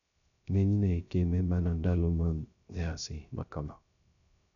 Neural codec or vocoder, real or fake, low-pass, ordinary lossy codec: codec, 16 kHz, 0.3 kbps, FocalCodec; fake; 7.2 kHz; none